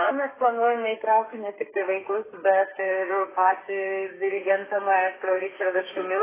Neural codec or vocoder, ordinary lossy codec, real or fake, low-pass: codec, 32 kHz, 1.9 kbps, SNAC; AAC, 16 kbps; fake; 3.6 kHz